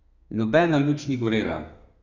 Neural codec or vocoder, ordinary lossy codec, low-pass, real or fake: codec, 32 kHz, 1.9 kbps, SNAC; none; 7.2 kHz; fake